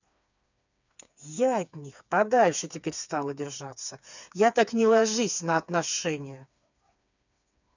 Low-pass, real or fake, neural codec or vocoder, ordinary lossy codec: 7.2 kHz; fake; codec, 16 kHz, 4 kbps, FreqCodec, smaller model; none